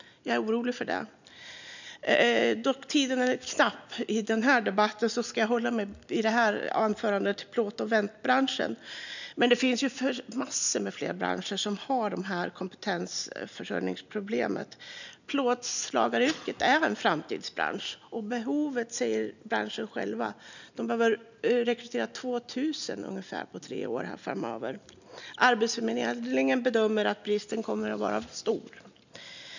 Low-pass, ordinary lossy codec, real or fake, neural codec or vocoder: 7.2 kHz; none; real; none